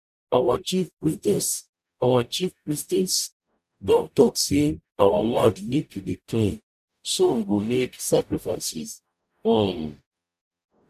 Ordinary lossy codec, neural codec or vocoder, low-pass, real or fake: none; codec, 44.1 kHz, 0.9 kbps, DAC; 14.4 kHz; fake